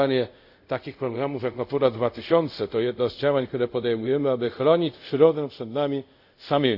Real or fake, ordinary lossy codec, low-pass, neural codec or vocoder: fake; Opus, 64 kbps; 5.4 kHz; codec, 24 kHz, 0.5 kbps, DualCodec